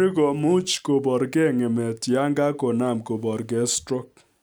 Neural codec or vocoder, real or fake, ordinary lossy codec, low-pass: none; real; none; none